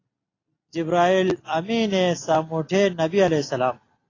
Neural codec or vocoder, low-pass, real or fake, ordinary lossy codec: none; 7.2 kHz; real; AAC, 32 kbps